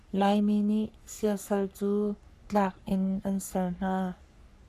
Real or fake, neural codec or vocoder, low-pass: fake; codec, 44.1 kHz, 3.4 kbps, Pupu-Codec; 14.4 kHz